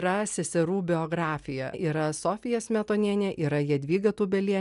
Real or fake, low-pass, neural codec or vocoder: real; 10.8 kHz; none